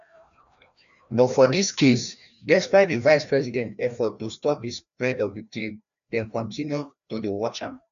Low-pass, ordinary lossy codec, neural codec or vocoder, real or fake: 7.2 kHz; none; codec, 16 kHz, 1 kbps, FreqCodec, larger model; fake